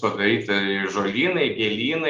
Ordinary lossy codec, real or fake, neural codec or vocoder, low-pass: Opus, 32 kbps; real; none; 7.2 kHz